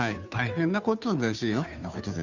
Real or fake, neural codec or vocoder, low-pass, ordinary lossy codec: fake; codec, 16 kHz, 2 kbps, FunCodec, trained on Chinese and English, 25 frames a second; 7.2 kHz; none